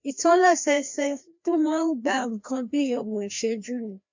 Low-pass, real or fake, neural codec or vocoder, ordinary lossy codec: 7.2 kHz; fake; codec, 16 kHz, 1 kbps, FreqCodec, larger model; MP3, 64 kbps